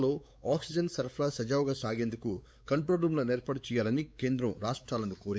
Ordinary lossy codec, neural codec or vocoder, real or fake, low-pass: none; codec, 16 kHz, 4 kbps, X-Codec, WavLM features, trained on Multilingual LibriSpeech; fake; none